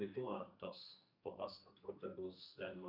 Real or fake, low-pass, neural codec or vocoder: fake; 5.4 kHz; codec, 44.1 kHz, 2.6 kbps, SNAC